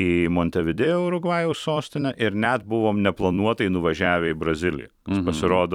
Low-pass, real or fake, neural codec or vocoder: 19.8 kHz; fake; vocoder, 44.1 kHz, 128 mel bands every 256 samples, BigVGAN v2